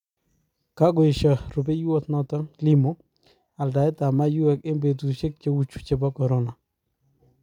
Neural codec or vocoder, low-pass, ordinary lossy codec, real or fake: none; 19.8 kHz; none; real